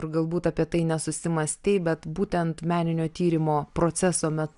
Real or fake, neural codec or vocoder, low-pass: real; none; 10.8 kHz